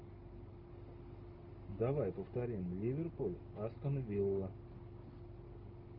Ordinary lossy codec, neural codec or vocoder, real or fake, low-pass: Opus, 32 kbps; none; real; 5.4 kHz